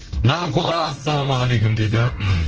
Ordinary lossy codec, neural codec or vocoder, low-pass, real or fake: Opus, 16 kbps; codec, 24 kHz, 1 kbps, SNAC; 7.2 kHz; fake